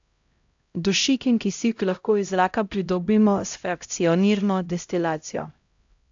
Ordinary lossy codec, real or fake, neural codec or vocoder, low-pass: AAC, 64 kbps; fake; codec, 16 kHz, 0.5 kbps, X-Codec, HuBERT features, trained on LibriSpeech; 7.2 kHz